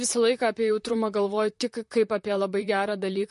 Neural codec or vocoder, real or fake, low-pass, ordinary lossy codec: vocoder, 44.1 kHz, 128 mel bands, Pupu-Vocoder; fake; 14.4 kHz; MP3, 48 kbps